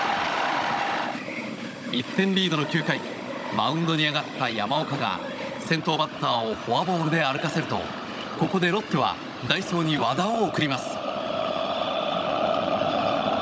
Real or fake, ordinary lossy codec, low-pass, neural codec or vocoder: fake; none; none; codec, 16 kHz, 16 kbps, FunCodec, trained on Chinese and English, 50 frames a second